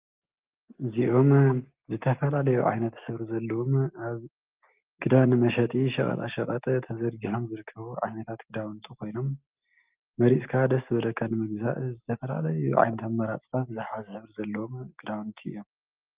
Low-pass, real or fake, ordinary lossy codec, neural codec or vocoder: 3.6 kHz; real; Opus, 32 kbps; none